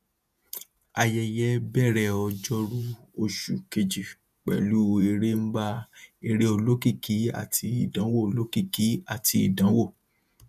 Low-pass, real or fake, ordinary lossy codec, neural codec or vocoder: 14.4 kHz; fake; none; vocoder, 48 kHz, 128 mel bands, Vocos